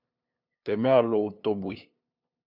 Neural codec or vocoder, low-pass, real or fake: codec, 16 kHz, 2 kbps, FunCodec, trained on LibriTTS, 25 frames a second; 5.4 kHz; fake